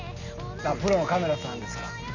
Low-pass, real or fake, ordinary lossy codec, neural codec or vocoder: 7.2 kHz; real; none; none